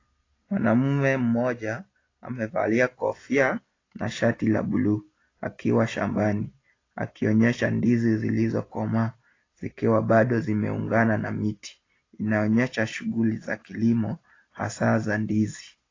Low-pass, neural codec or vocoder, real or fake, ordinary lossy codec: 7.2 kHz; none; real; AAC, 32 kbps